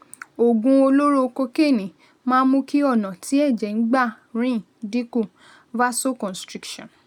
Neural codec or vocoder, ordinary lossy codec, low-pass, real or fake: none; none; 19.8 kHz; real